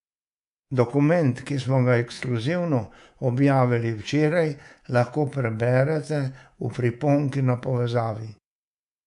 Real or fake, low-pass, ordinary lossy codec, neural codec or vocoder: fake; 10.8 kHz; none; codec, 24 kHz, 3.1 kbps, DualCodec